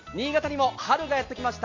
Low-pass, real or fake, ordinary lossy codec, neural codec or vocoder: 7.2 kHz; real; MP3, 48 kbps; none